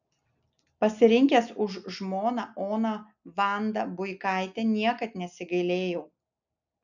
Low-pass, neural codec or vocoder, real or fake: 7.2 kHz; none; real